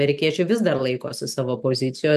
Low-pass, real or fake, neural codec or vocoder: 14.4 kHz; real; none